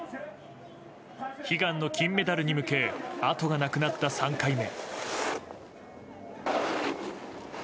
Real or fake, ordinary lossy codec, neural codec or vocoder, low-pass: real; none; none; none